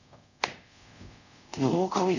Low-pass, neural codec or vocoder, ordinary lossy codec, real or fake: 7.2 kHz; codec, 24 kHz, 0.5 kbps, DualCodec; none; fake